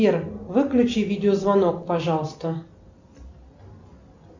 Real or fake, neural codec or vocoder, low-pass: real; none; 7.2 kHz